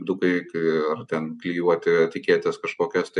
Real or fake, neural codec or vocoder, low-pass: real; none; 10.8 kHz